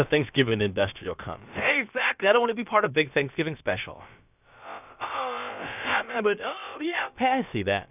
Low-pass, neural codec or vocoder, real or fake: 3.6 kHz; codec, 16 kHz, about 1 kbps, DyCAST, with the encoder's durations; fake